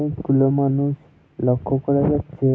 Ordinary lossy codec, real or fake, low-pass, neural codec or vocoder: none; real; none; none